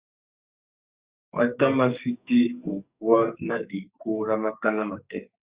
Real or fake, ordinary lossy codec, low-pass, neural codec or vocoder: fake; Opus, 64 kbps; 3.6 kHz; codec, 44.1 kHz, 2.6 kbps, SNAC